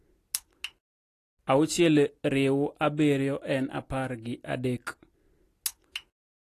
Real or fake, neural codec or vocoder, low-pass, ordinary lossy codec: real; none; 14.4 kHz; AAC, 48 kbps